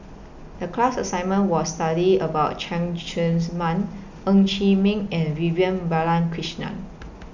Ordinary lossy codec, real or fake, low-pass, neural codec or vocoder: none; real; 7.2 kHz; none